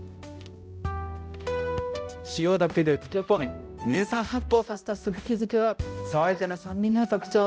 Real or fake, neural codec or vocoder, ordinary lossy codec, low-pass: fake; codec, 16 kHz, 0.5 kbps, X-Codec, HuBERT features, trained on balanced general audio; none; none